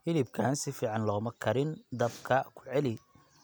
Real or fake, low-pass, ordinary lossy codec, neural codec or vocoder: real; none; none; none